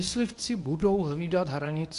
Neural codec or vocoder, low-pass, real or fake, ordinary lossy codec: codec, 24 kHz, 0.9 kbps, WavTokenizer, medium speech release version 1; 10.8 kHz; fake; AAC, 64 kbps